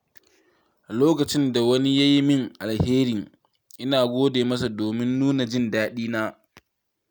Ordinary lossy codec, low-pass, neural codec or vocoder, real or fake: none; none; none; real